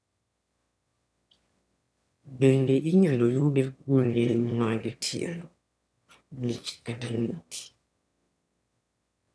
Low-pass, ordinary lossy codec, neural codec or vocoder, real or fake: none; none; autoencoder, 22.05 kHz, a latent of 192 numbers a frame, VITS, trained on one speaker; fake